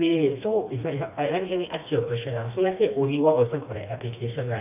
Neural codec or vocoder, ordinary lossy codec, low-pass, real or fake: codec, 16 kHz, 2 kbps, FreqCodec, smaller model; none; 3.6 kHz; fake